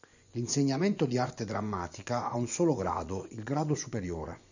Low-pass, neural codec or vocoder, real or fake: 7.2 kHz; vocoder, 24 kHz, 100 mel bands, Vocos; fake